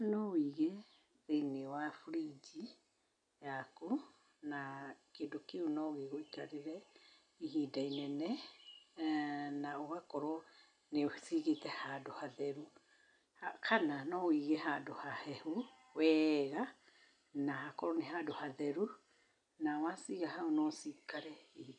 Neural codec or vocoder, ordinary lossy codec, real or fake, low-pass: none; none; real; 9.9 kHz